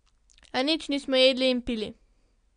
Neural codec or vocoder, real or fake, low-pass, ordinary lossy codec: none; real; 9.9 kHz; MP3, 64 kbps